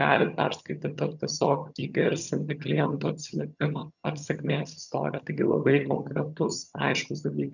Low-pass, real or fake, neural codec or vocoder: 7.2 kHz; fake; vocoder, 22.05 kHz, 80 mel bands, HiFi-GAN